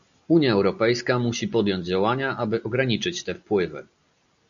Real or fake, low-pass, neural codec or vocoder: real; 7.2 kHz; none